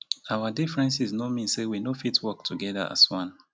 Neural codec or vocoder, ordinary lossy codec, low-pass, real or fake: none; none; none; real